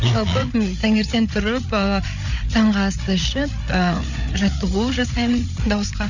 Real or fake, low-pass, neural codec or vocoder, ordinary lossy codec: fake; 7.2 kHz; codec, 16 kHz, 8 kbps, FreqCodec, larger model; none